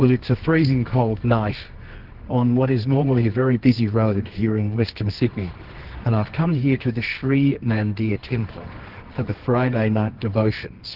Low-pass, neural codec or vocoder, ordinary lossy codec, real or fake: 5.4 kHz; codec, 24 kHz, 0.9 kbps, WavTokenizer, medium music audio release; Opus, 16 kbps; fake